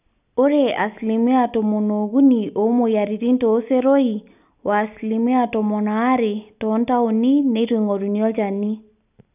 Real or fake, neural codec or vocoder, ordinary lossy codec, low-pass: real; none; none; 3.6 kHz